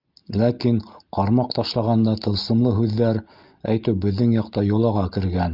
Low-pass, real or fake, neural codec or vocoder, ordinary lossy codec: 5.4 kHz; real; none; Opus, 32 kbps